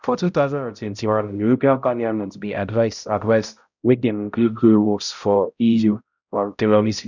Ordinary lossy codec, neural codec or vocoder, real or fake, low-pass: none; codec, 16 kHz, 0.5 kbps, X-Codec, HuBERT features, trained on balanced general audio; fake; 7.2 kHz